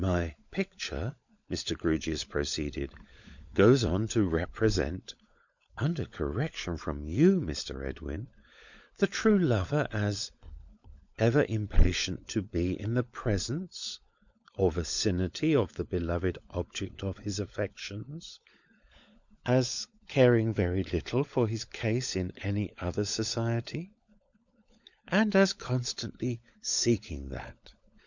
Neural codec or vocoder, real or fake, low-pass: codec, 16 kHz, 16 kbps, FunCodec, trained on LibriTTS, 50 frames a second; fake; 7.2 kHz